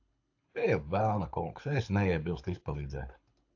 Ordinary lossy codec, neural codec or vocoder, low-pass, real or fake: AAC, 48 kbps; codec, 24 kHz, 6 kbps, HILCodec; 7.2 kHz; fake